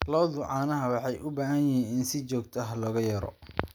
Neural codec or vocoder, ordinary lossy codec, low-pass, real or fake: none; none; none; real